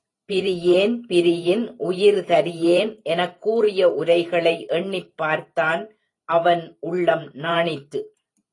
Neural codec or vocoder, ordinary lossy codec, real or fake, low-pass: vocoder, 44.1 kHz, 128 mel bands every 512 samples, BigVGAN v2; AAC, 32 kbps; fake; 10.8 kHz